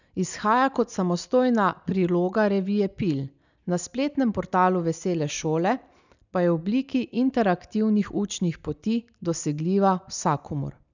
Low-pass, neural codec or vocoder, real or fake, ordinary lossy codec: 7.2 kHz; none; real; none